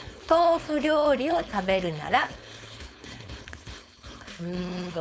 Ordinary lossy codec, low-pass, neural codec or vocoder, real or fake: none; none; codec, 16 kHz, 4.8 kbps, FACodec; fake